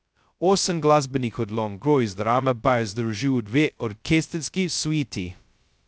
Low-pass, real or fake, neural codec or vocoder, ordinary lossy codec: none; fake; codec, 16 kHz, 0.2 kbps, FocalCodec; none